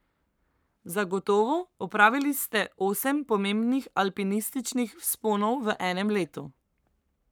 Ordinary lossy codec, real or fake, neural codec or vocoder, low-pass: none; fake; codec, 44.1 kHz, 7.8 kbps, Pupu-Codec; none